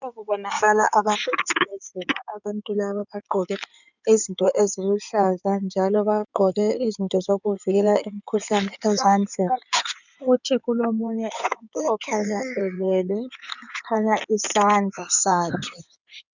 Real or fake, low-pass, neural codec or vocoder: fake; 7.2 kHz; codec, 16 kHz in and 24 kHz out, 2.2 kbps, FireRedTTS-2 codec